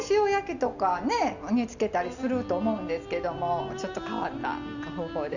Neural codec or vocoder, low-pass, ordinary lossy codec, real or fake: none; 7.2 kHz; none; real